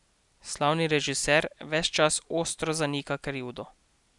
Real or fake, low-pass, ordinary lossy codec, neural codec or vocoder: real; 10.8 kHz; none; none